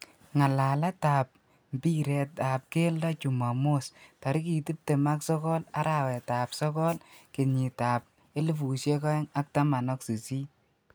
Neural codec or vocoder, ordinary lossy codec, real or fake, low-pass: none; none; real; none